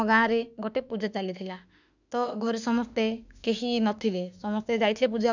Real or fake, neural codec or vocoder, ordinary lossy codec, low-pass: fake; autoencoder, 48 kHz, 32 numbers a frame, DAC-VAE, trained on Japanese speech; none; 7.2 kHz